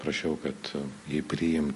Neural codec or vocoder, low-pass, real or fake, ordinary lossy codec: none; 14.4 kHz; real; MP3, 48 kbps